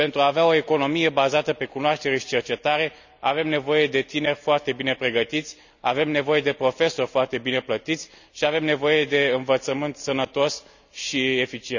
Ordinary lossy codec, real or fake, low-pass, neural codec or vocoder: none; real; 7.2 kHz; none